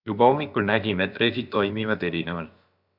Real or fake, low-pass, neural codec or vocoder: fake; 5.4 kHz; codec, 16 kHz, about 1 kbps, DyCAST, with the encoder's durations